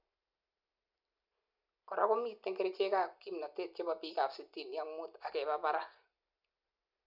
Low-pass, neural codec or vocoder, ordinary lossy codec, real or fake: 5.4 kHz; none; none; real